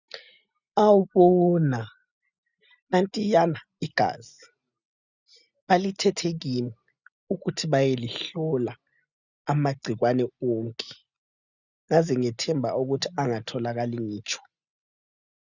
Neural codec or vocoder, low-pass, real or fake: none; 7.2 kHz; real